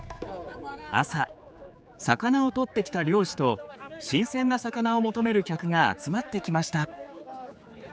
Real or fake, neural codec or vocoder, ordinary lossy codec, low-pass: fake; codec, 16 kHz, 4 kbps, X-Codec, HuBERT features, trained on general audio; none; none